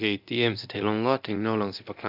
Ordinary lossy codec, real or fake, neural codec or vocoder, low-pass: none; fake; codec, 24 kHz, 0.9 kbps, DualCodec; 5.4 kHz